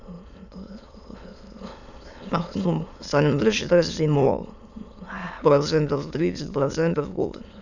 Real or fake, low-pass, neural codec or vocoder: fake; 7.2 kHz; autoencoder, 22.05 kHz, a latent of 192 numbers a frame, VITS, trained on many speakers